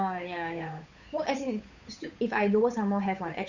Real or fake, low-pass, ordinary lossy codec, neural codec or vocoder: fake; 7.2 kHz; none; codec, 16 kHz, 8 kbps, FunCodec, trained on Chinese and English, 25 frames a second